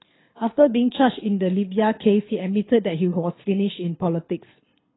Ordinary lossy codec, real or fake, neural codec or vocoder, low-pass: AAC, 16 kbps; fake; codec, 24 kHz, 6 kbps, HILCodec; 7.2 kHz